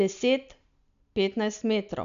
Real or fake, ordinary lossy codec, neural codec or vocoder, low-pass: real; MP3, 96 kbps; none; 7.2 kHz